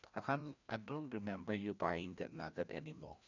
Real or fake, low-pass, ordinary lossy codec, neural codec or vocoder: fake; 7.2 kHz; none; codec, 16 kHz, 1 kbps, FreqCodec, larger model